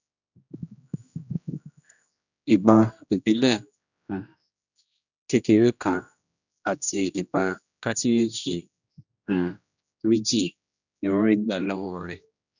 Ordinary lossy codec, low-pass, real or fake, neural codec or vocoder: none; 7.2 kHz; fake; codec, 16 kHz, 1 kbps, X-Codec, HuBERT features, trained on general audio